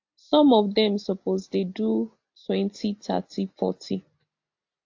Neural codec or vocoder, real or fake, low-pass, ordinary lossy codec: none; real; 7.2 kHz; AAC, 48 kbps